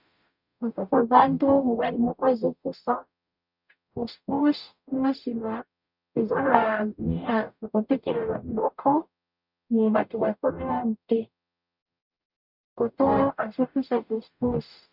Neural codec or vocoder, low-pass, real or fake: codec, 44.1 kHz, 0.9 kbps, DAC; 5.4 kHz; fake